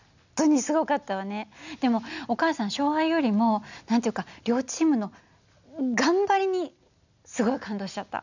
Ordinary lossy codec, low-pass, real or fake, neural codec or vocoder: none; 7.2 kHz; real; none